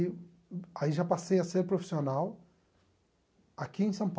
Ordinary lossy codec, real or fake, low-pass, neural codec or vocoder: none; real; none; none